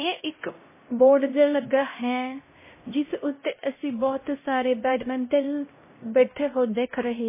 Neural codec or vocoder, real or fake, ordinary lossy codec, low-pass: codec, 16 kHz, 0.5 kbps, X-Codec, HuBERT features, trained on LibriSpeech; fake; MP3, 16 kbps; 3.6 kHz